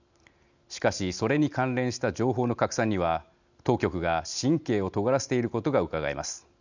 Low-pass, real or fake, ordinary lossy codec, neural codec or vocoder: 7.2 kHz; real; none; none